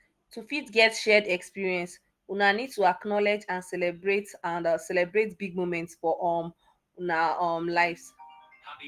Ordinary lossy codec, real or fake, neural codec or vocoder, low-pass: Opus, 24 kbps; real; none; 14.4 kHz